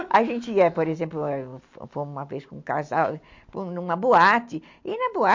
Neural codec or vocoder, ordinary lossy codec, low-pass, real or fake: none; MP3, 48 kbps; 7.2 kHz; real